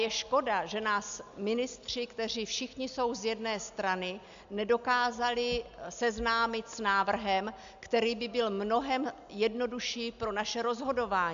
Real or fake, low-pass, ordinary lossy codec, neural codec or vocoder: real; 7.2 kHz; MP3, 64 kbps; none